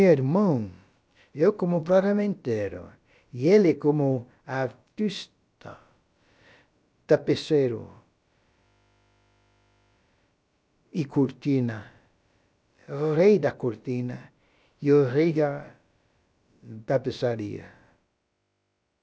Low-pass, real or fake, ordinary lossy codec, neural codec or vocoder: none; fake; none; codec, 16 kHz, about 1 kbps, DyCAST, with the encoder's durations